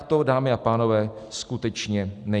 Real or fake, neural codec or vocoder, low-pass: real; none; 10.8 kHz